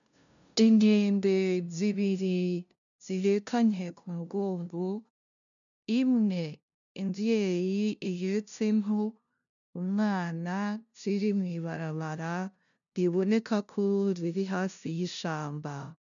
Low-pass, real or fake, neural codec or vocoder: 7.2 kHz; fake; codec, 16 kHz, 0.5 kbps, FunCodec, trained on LibriTTS, 25 frames a second